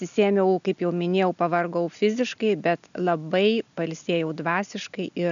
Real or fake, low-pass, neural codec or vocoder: real; 7.2 kHz; none